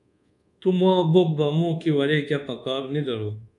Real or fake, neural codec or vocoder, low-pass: fake; codec, 24 kHz, 1.2 kbps, DualCodec; 10.8 kHz